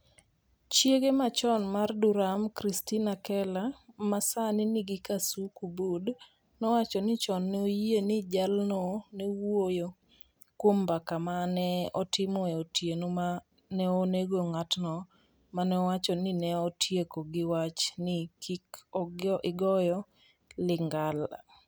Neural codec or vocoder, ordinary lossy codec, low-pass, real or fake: none; none; none; real